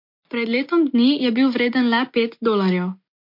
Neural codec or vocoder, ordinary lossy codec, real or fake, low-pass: none; MP3, 32 kbps; real; 5.4 kHz